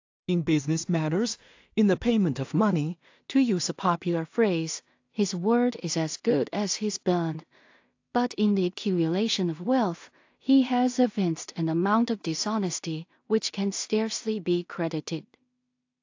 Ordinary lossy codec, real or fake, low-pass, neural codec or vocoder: AAC, 48 kbps; fake; 7.2 kHz; codec, 16 kHz in and 24 kHz out, 0.4 kbps, LongCat-Audio-Codec, two codebook decoder